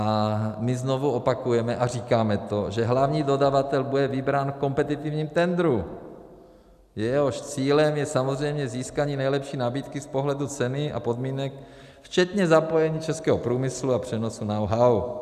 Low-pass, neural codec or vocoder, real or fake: 14.4 kHz; none; real